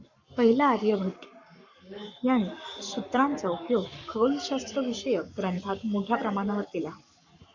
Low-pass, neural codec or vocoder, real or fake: 7.2 kHz; codec, 44.1 kHz, 7.8 kbps, Pupu-Codec; fake